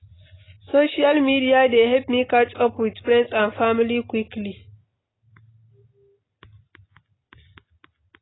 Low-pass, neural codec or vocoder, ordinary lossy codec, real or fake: 7.2 kHz; none; AAC, 16 kbps; real